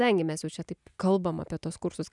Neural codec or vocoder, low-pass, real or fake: none; 10.8 kHz; real